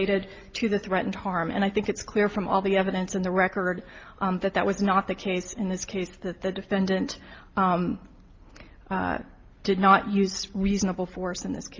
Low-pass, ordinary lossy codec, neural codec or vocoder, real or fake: 7.2 kHz; Opus, 24 kbps; none; real